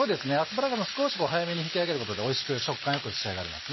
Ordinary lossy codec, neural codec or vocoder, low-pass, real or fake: MP3, 24 kbps; none; 7.2 kHz; real